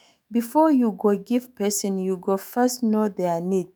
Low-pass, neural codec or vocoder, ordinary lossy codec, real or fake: none; autoencoder, 48 kHz, 128 numbers a frame, DAC-VAE, trained on Japanese speech; none; fake